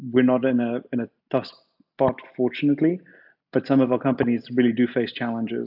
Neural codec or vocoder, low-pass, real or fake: none; 5.4 kHz; real